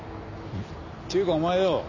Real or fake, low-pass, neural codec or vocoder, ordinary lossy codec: real; 7.2 kHz; none; none